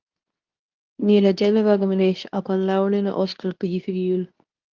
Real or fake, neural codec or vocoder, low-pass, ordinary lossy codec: fake; codec, 24 kHz, 0.9 kbps, WavTokenizer, medium speech release version 2; 7.2 kHz; Opus, 24 kbps